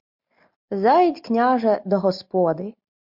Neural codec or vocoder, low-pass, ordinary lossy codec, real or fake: none; 5.4 kHz; MP3, 48 kbps; real